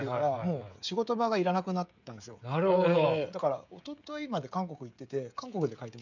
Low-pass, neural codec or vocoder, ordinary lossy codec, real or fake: 7.2 kHz; codec, 16 kHz, 16 kbps, FreqCodec, smaller model; none; fake